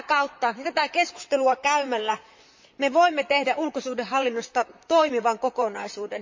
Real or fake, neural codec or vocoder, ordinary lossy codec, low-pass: fake; vocoder, 44.1 kHz, 128 mel bands, Pupu-Vocoder; MP3, 64 kbps; 7.2 kHz